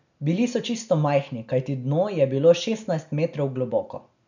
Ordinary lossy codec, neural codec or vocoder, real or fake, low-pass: none; none; real; 7.2 kHz